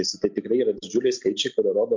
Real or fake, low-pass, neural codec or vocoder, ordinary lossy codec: real; 7.2 kHz; none; MP3, 64 kbps